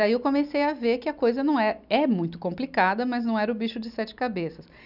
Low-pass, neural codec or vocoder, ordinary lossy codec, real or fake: 5.4 kHz; none; none; real